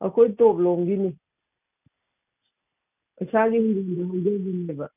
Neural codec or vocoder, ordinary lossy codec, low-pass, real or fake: none; none; 3.6 kHz; real